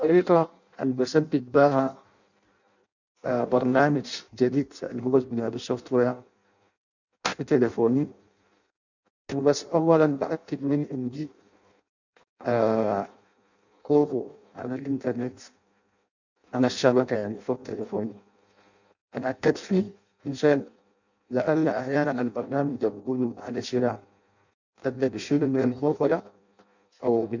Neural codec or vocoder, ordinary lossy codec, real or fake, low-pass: codec, 16 kHz in and 24 kHz out, 0.6 kbps, FireRedTTS-2 codec; none; fake; 7.2 kHz